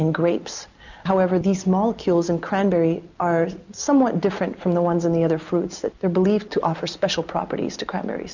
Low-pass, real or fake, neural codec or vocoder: 7.2 kHz; real; none